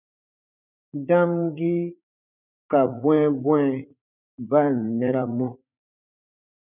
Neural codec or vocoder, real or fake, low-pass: vocoder, 44.1 kHz, 80 mel bands, Vocos; fake; 3.6 kHz